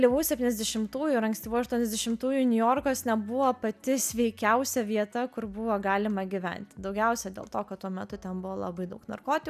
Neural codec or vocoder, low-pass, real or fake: none; 14.4 kHz; real